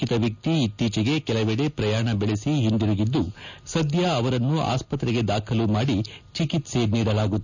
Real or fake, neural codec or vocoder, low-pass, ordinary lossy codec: real; none; 7.2 kHz; none